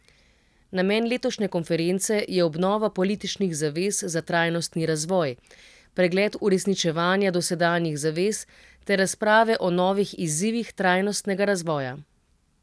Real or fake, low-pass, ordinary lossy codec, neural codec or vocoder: real; none; none; none